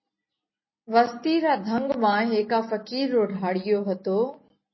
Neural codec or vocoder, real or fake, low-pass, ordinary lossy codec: none; real; 7.2 kHz; MP3, 24 kbps